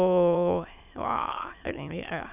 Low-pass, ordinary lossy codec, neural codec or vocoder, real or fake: 3.6 kHz; none; autoencoder, 22.05 kHz, a latent of 192 numbers a frame, VITS, trained on many speakers; fake